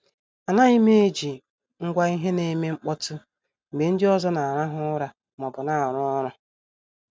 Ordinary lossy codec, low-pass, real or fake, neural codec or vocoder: none; none; real; none